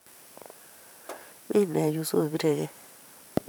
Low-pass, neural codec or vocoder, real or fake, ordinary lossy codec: none; vocoder, 44.1 kHz, 128 mel bands every 512 samples, BigVGAN v2; fake; none